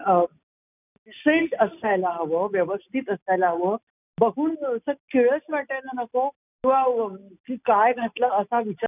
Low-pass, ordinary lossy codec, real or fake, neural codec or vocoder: 3.6 kHz; none; real; none